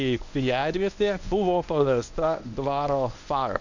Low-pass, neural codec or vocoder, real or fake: 7.2 kHz; codec, 24 kHz, 0.9 kbps, WavTokenizer, medium speech release version 1; fake